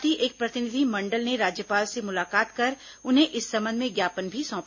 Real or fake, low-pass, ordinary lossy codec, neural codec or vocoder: real; 7.2 kHz; MP3, 32 kbps; none